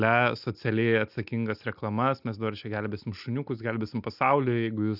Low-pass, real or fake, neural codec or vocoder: 5.4 kHz; real; none